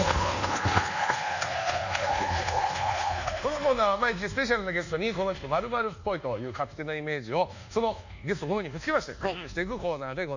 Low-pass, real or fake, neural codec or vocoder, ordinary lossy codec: 7.2 kHz; fake; codec, 24 kHz, 1.2 kbps, DualCodec; none